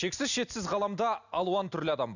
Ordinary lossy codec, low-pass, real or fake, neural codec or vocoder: none; 7.2 kHz; real; none